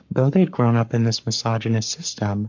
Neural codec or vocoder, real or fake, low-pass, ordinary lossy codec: codec, 44.1 kHz, 7.8 kbps, Pupu-Codec; fake; 7.2 kHz; MP3, 64 kbps